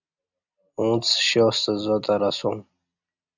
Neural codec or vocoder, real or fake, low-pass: none; real; 7.2 kHz